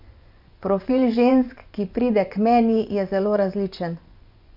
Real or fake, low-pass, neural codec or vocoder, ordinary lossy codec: fake; 5.4 kHz; vocoder, 24 kHz, 100 mel bands, Vocos; MP3, 48 kbps